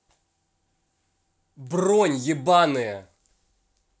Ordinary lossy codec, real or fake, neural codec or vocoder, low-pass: none; real; none; none